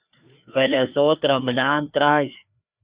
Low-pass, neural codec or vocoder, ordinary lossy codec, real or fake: 3.6 kHz; codec, 16 kHz, 2 kbps, FreqCodec, larger model; Opus, 64 kbps; fake